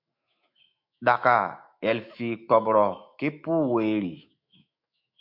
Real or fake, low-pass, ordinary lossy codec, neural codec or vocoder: fake; 5.4 kHz; MP3, 48 kbps; autoencoder, 48 kHz, 128 numbers a frame, DAC-VAE, trained on Japanese speech